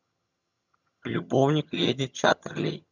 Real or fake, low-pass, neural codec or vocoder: fake; 7.2 kHz; vocoder, 22.05 kHz, 80 mel bands, HiFi-GAN